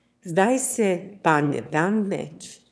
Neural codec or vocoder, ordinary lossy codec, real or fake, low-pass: autoencoder, 22.05 kHz, a latent of 192 numbers a frame, VITS, trained on one speaker; none; fake; none